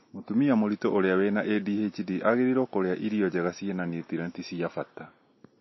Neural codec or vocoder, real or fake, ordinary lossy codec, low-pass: none; real; MP3, 24 kbps; 7.2 kHz